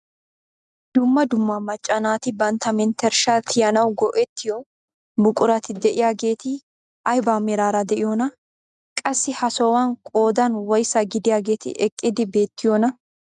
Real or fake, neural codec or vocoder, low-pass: real; none; 10.8 kHz